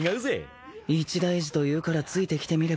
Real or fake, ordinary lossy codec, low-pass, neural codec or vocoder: real; none; none; none